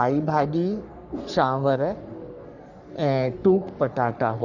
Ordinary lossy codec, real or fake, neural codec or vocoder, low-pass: none; fake; codec, 44.1 kHz, 3.4 kbps, Pupu-Codec; 7.2 kHz